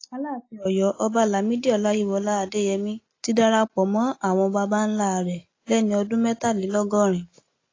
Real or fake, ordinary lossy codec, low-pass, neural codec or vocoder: real; AAC, 32 kbps; 7.2 kHz; none